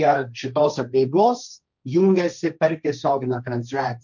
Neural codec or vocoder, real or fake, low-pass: codec, 16 kHz, 1.1 kbps, Voila-Tokenizer; fake; 7.2 kHz